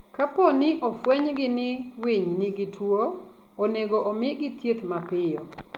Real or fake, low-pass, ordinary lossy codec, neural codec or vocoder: real; 19.8 kHz; Opus, 24 kbps; none